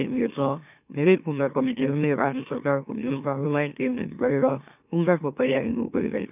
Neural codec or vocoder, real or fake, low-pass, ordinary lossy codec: autoencoder, 44.1 kHz, a latent of 192 numbers a frame, MeloTTS; fake; 3.6 kHz; none